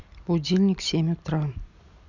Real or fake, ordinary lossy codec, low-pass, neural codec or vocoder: real; none; 7.2 kHz; none